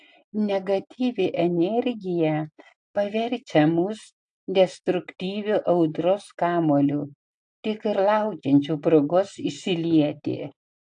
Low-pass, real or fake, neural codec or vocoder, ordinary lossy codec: 9.9 kHz; real; none; AAC, 64 kbps